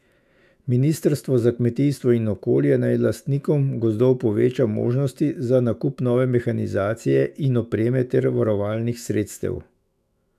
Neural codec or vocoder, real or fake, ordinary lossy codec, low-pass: autoencoder, 48 kHz, 128 numbers a frame, DAC-VAE, trained on Japanese speech; fake; none; 14.4 kHz